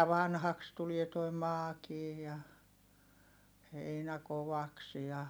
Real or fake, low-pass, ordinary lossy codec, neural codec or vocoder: real; none; none; none